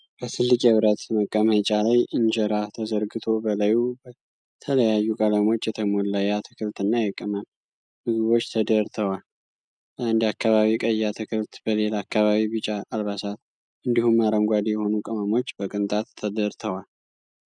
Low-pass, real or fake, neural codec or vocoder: 9.9 kHz; real; none